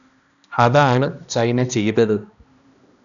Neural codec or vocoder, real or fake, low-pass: codec, 16 kHz, 1 kbps, X-Codec, HuBERT features, trained on balanced general audio; fake; 7.2 kHz